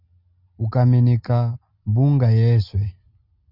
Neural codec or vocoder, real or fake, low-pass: none; real; 5.4 kHz